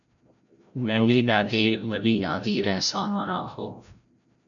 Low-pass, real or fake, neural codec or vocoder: 7.2 kHz; fake; codec, 16 kHz, 0.5 kbps, FreqCodec, larger model